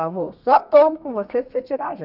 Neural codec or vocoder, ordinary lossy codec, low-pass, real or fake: codec, 32 kHz, 1.9 kbps, SNAC; none; 5.4 kHz; fake